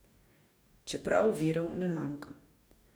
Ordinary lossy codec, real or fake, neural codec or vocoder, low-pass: none; fake; codec, 44.1 kHz, 2.6 kbps, DAC; none